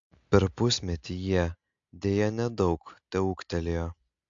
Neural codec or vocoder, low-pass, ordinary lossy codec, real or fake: none; 7.2 kHz; AAC, 64 kbps; real